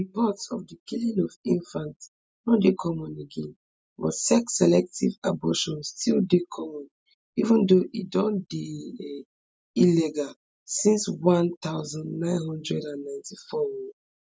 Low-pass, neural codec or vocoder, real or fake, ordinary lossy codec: none; none; real; none